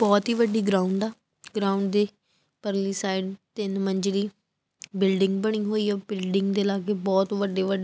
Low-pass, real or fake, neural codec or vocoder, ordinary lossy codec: none; real; none; none